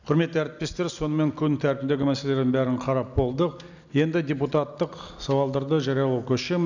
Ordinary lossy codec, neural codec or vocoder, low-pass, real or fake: none; none; 7.2 kHz; real